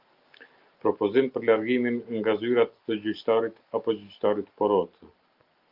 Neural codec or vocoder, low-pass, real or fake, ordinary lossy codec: none; 5.4 kHz; real; Opus, 24 kbps